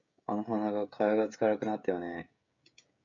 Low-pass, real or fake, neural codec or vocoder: 7.2 kHz; fake; codec, 16 kHz, 16 kbps, FreqCodec, smaller model